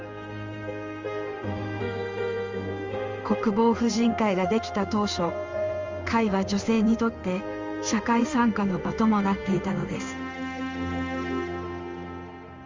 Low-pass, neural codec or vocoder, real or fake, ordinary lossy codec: 7.2 kHz; codec, 16 kHz in and 24 kHz out, 1 kbps, XY-Tokenizer; fake; Opus, 32 kbps